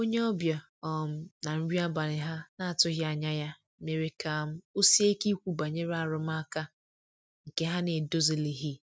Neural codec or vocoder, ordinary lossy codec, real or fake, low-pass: none; none; real; none